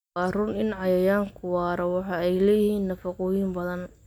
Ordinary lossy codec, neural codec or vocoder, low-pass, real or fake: none; none; 19.8 kHz; real